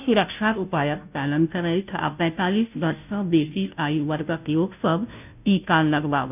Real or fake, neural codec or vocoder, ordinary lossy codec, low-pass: fake; codec, 16 kHz, 0.5 kbps, FunCodec, trained on Chinese and English, 25 frames a second; none; 3.6 kHz